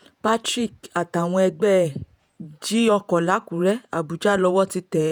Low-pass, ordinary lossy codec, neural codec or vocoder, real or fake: none; none; vocoder, 48 kHz, 128 mel bands, Vocos; fake